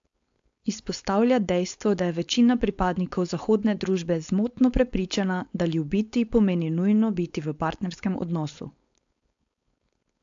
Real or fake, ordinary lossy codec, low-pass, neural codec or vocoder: fake; AAC, 64 kbps; 7.2 kHz; codec, 16 kHz, 4.8 kbps, FACodec